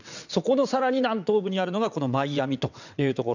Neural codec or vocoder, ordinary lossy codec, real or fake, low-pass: vocoder, 22.05 kHz, 80 mel bands, WaveNeXt; none; fake; 7.2 kHz